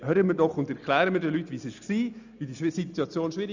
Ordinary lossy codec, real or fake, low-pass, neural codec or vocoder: none; real; 7.2 kHz; none